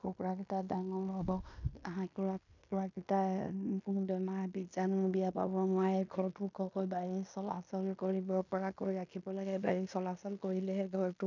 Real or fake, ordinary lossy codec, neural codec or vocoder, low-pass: fake; none; codec, 16 kHz in and 24 kHz out, 0.9 kbps, LongCat-Audio-Codec, fine tuned four codebook decoder; 7.2 kHz